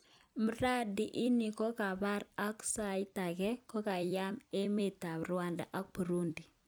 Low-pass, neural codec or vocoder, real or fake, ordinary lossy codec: none; vocoder, 44.1 kHz, 128 mel bands every 256 samples, BigVGAN v2; fake; none